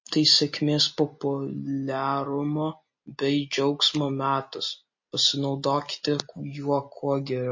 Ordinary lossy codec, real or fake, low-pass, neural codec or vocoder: MP3, 32 kbps; real; 7.2 kHz; none